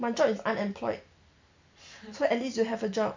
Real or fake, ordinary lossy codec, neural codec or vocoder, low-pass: real; MP3, 48 kbps; none; 7.2 kHz